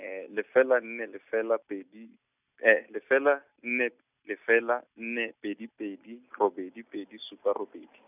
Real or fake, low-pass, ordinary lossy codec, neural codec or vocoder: real; 3.6 kHz; none; none